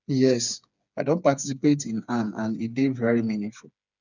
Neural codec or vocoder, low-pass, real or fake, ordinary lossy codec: codec, 16 kHz, 4 kbps, FreqCodec, smaller model; 7.2 kHz; fake; none